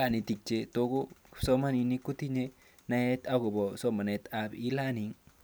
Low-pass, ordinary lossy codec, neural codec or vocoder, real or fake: none; none; none; real